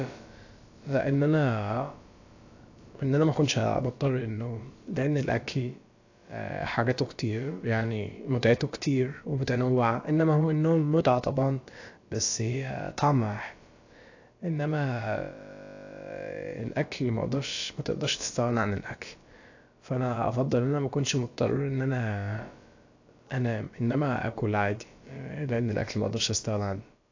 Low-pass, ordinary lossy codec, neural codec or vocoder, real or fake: 7.2 kHz; AAC, 48 kbps; codec, 16 kHz, about 1 kbps, DyCAST, with the encoder's durations; fake